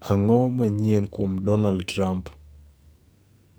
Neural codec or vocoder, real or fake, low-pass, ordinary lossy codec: codec, 44.1 kHz, 2.6 kbps, SNAC; fake; none; none